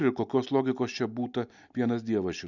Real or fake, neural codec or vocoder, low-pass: real; none; 7.2 kHz